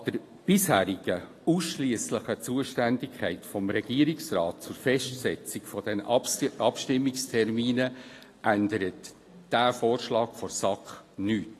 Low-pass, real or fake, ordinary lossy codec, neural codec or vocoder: 14.4 kHz; real; AAC, 48 kbps; none